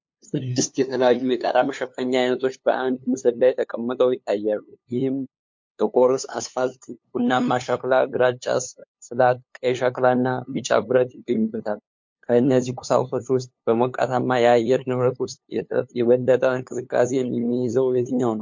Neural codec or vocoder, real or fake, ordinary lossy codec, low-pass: codec, 16 kHz, 2 kbps, FunCodec, trained on LibriTTS, 25 frames a second; fake; MP3, 48 kbps; 7.2 kHz